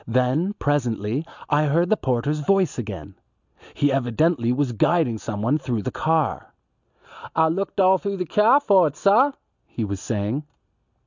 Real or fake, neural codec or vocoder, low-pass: real; none; 7.2 kHz